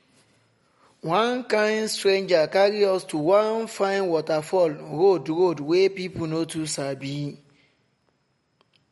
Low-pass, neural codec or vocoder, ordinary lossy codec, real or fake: 19.8 kHz; none; MP3, 48 kbps; real